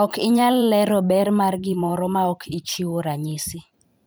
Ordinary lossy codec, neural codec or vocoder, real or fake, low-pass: none; vocoder, 44.1 kHz, 128 mel bands every 512 samples, BigVGAN v2; fake; none